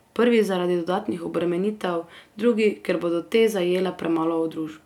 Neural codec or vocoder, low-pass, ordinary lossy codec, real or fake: none; 19.8 kHz; none; real